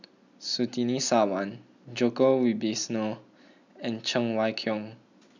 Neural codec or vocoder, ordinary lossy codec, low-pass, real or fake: none; none; 7.2 kHz; real